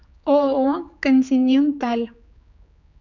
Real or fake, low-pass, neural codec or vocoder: fake; 7.2 kHz; codec, 16 kHz, 4 kbps, X-Codec, HuBERT features, trained on general audio